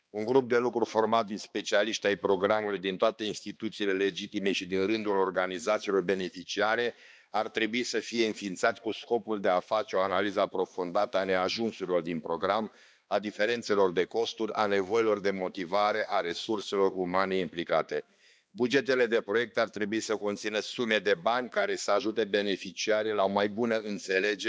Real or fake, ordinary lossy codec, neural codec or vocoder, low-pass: fake; none; codec, 16 kHz, 2 kbps, X-Codec, HuBERT features, trained on balanced general audio; none